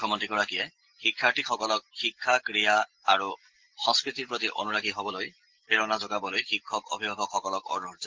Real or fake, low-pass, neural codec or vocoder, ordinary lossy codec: real; 7.2 kHz; none; Opus, 16 kbps